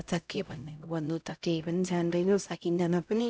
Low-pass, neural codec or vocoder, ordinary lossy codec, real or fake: none; codec, 16 kHz, 0.5 kbps, X-Codec, HuBERT features, trained on LibriSpeech; none; fake